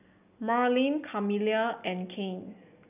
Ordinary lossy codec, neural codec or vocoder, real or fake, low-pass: none; none; real; 3.6 kHz